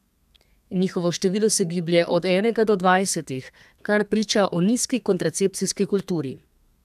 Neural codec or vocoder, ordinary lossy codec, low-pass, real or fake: codec, 32 kHz, 1.9 kbps, SNAC; none; 14.4 kHz; fake